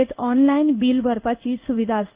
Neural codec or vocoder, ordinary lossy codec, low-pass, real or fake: codec, 16 kHz in and 24 kHz out, 1 kbps, XY-Tokenizer; Opus, 24 kbps; 3.6 kHz; fake